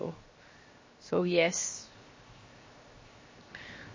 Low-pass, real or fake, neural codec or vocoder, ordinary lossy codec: 7.2 kHz; fake; codec, 16 kHz, 0.7 kbps, FocalCodec; MP3, 32 kbps